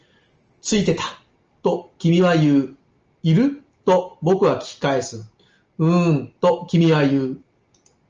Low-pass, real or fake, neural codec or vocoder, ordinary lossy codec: 7.2 kHz; real; none; Opus, 24 kbps